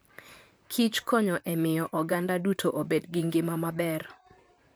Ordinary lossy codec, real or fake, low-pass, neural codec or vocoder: none; fake; none; vocoder, 44.1 kHz, 128 mel bands, Pupu-Vocoder